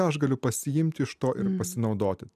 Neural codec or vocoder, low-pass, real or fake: none; 14.4 kHz; real